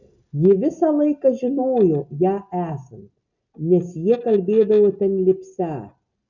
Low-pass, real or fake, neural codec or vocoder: 7.2 kHz; real; none